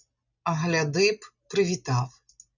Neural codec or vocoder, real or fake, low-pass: none; real; 7.2 kHz